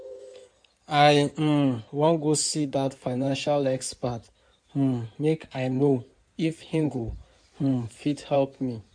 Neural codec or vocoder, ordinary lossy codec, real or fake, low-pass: codec, 16 kHz in and 24 kHz out, 2.2 kbps, FireRedTTS-2 codec; none; fake; 9.9 kHz